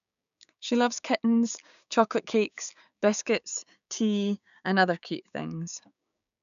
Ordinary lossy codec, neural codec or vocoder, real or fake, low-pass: none; codec, 16 kHz, 6 kbps, DAC; fake; 7.2 kHz